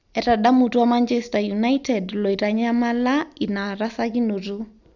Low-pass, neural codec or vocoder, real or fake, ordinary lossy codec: 7.2 kHz; none; real; none